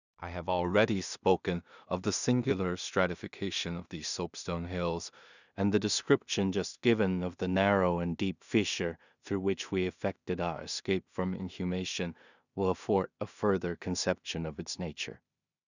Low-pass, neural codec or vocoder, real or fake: 7.2 kHz; codec, 16 kHz in and 24 kHz out, 0.4 kbps, LongCat-Audio-Codec, two codebook decoder; fake